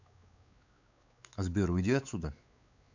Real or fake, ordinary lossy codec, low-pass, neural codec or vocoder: fake; none; 7.2 kHz; codec, 16 kHz, 4 kbps, X-Codec, WavLM features, trained on Multilingual LibriSpeech